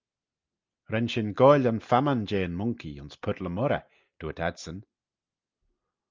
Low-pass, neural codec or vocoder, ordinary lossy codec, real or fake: 7.2 kHz; none; Opus, 24 kbps; real